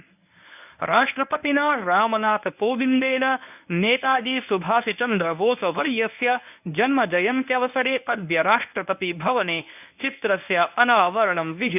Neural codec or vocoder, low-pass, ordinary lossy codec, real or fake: codec, 24 kHz, 0.9 kbps, WavTokenizer, medium speech release version 1; 3.6 kHz; none; fake